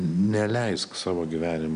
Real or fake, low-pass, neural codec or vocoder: real; 9.9 kHz; none